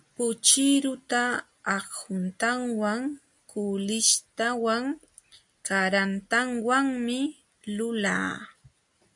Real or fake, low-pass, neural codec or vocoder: real; 10.8 kHz; none